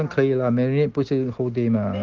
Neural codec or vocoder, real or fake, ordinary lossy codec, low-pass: none; real; Opus, 16 kbps; 7.2 kHz